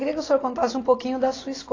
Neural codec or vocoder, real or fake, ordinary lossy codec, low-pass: none; real; AAC, 32 kbps; 7.2 kHz